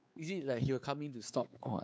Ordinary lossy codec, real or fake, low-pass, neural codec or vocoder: none; fake; none; codec, 16 kHz, 4 kbps, X-Codec, WavLM features, trained on Multilingual LibriSpeech